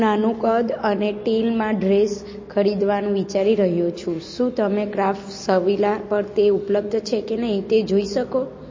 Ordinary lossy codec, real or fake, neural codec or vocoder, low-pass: MP3, 32 kbps; real; none; 7.2 kHz